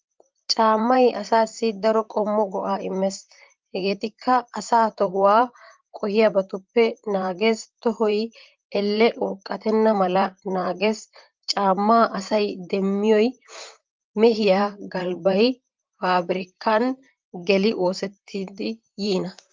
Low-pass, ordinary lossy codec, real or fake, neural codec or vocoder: 7.2 kHz; Opus, 32 kbps; fake; vocoder, 44.1 kHz, 128 mel bands, Pupu-Vocoder